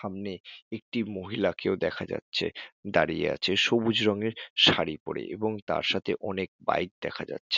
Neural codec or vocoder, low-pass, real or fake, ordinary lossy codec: none; 7.2 kHz; real; none